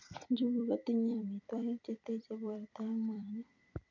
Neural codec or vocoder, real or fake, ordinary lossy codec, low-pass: vocoder, 44.1 kHz, 128 mel bands every 256 samples, BigVGAN v2; fake; MP3, 48 kbps; 7.2 kHz